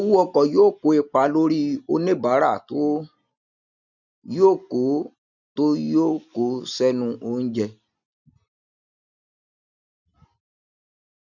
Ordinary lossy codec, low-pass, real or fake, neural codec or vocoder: none; 7.2 kHz; real; none